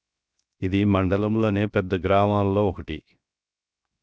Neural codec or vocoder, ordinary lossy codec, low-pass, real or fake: codec, 16 kHz, 0.7 kbps, FocalCodec; none; none; fake